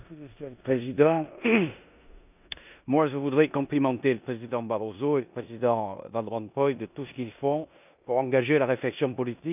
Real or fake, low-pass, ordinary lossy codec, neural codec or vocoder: fake; 3.6 kHz; none; codec, 16 kHz in and 24 kHz out, 0.9 kbps, LongCat-Audio-Codec, four codebook decoder